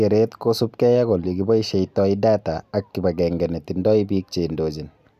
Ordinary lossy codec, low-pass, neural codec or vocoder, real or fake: none; 14.4 kHz; none; real